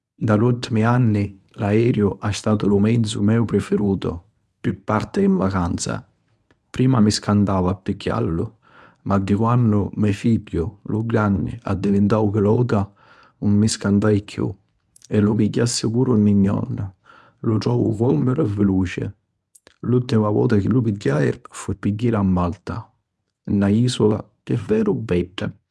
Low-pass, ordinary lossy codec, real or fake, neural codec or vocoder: none; none; fake; codec, 24 kHz, 0.9 kbps, WavTokenizer, medium speech release version 1